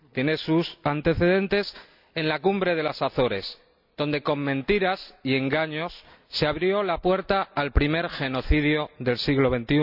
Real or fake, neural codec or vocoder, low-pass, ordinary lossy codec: real; none; 5.4 kHz; none